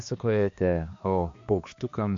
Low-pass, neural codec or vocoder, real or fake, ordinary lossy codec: 7.2 kHz; codec, 16 kHz, 2 kbps, X-Codec, HuBERT features, trained on balanced general audio; fake; MP3, 48 kbps